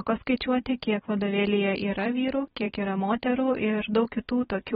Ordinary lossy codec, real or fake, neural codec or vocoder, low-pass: AAC, 16 kbps; fake; vocoder, 44.1 kHz, 128 mel bands every 256 samples, BigVGAN v2; 19.8 kHz